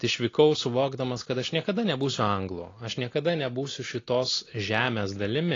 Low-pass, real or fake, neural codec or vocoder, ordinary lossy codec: 7.2 kHz; real; none; AAC, 32 kbps